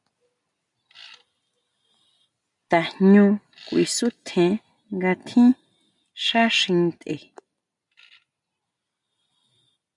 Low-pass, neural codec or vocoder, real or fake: 10.8 kHz; none; real